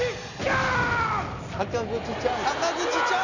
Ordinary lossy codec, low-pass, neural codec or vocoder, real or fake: none; 7.2 kHz; none; real